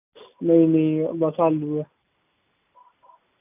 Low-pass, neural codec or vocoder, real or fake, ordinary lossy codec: 3.6 kHz; none; real; none